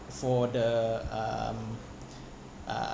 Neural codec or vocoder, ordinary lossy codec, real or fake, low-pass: none; none; real; none